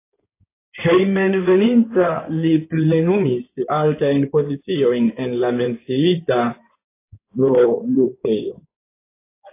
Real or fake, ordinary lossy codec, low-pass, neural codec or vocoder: fake; AAC, 24 kbps; 3.6 kHz; codec, 16 kHz in and 24 kHz out, 2.2 kbps, FireRedTTS-2 codec